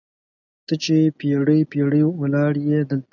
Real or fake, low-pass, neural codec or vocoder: real; 7.2 kHz; none